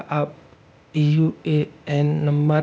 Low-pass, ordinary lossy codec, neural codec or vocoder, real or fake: none; none; codec, 16 kHz, 0.8 kbps, ZipCodec; fake